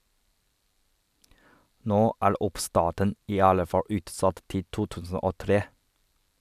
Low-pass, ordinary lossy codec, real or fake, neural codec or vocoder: 14.4 kHz; none; real; none